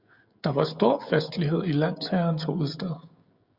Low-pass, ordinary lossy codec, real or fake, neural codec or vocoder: 5.4 kHz; Opus, 64 kbps; fake; codec, 16 kHz, 16 kbps, FreqCodec, smaller model